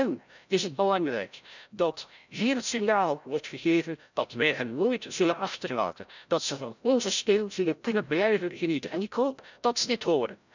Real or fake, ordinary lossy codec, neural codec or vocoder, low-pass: fake; none; codec, 16 kHz, 0.5 kbps, FreqCodec, larger model; 7.2 kHz